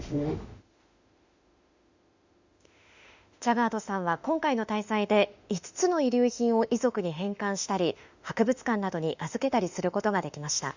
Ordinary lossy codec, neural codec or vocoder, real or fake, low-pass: Opus, 64 kbps; autoencoder, 48 kHz, 32 numbers a frame, DAC-VAE, trained on Japanese speech; fake; 7.2 kHz